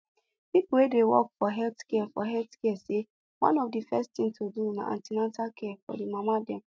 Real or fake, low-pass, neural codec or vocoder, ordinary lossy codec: real; none; none; none